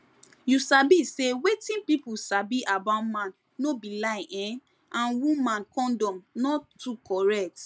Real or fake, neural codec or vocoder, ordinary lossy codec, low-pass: real; none; none; none